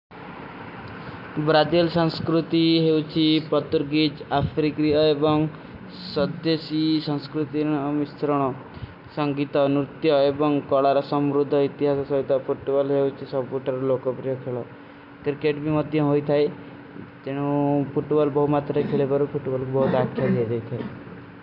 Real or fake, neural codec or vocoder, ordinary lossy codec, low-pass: real; none; none; 5.4 kHz